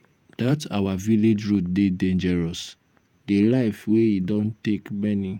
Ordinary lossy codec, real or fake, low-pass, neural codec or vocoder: none; fake; 19.8 kHz; vocoder, 48 kHz, 128 mel bands, Vocos